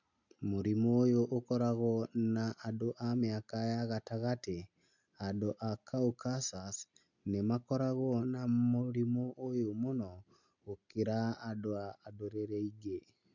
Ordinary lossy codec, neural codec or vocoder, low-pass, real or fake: none; none; 7.2 kHz; real